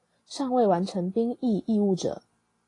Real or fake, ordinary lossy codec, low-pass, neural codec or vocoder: real; AAC, 32 kbps; 10.8 kHz; none